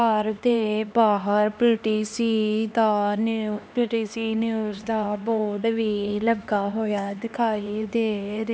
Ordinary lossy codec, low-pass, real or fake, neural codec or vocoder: none; none; fake; codec, 16 kHz, 2 kbps, X-Codec, HuBERT features, trained on LibriSpeech